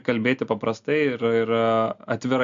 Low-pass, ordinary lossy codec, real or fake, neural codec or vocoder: 7.2 kHz; MP3, 64 kbps; real; none